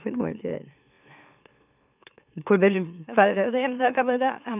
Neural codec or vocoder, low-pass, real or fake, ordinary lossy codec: autoencoder, 44.1 kHz, a latent of 192 numbers a frame, MeloTTS; 3.6 kHz; fake; none